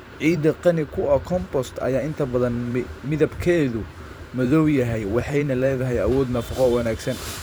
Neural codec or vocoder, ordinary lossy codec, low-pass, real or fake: vocoder, 44.1 kHz, 128 mel bands every 512 samples, BigVGAN v2; none; none; fake